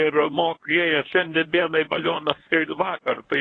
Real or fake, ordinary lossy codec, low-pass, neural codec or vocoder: fake; AAC, 32 kbps; 9.9 kHz; codec, 24 kHz, 0.9 kbps, WavTokenizer, medium speech release version 1